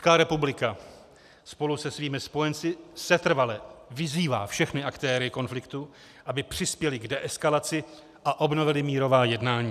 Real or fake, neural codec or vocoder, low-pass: real; none; 14.4 kHz